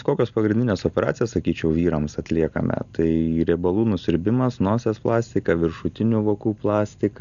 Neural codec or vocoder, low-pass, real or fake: none; 7.2 kHz; real